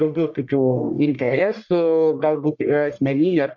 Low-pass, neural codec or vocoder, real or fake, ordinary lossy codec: 7.2 kHz; codec, 44.1 kHz, 1.7 kbps, Pupu-Codec; fake; MP3, 48 kbps